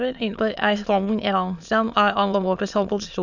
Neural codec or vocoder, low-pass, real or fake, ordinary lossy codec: autoencoder, 22.05 kHz, a latent of 192 numbers a frame, VITS, trained on many speakers; 7.2 kHz; fake; none